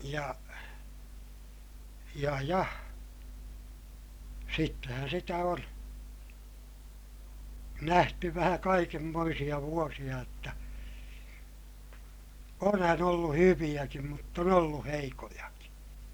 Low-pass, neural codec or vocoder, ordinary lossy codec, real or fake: none; none; none; real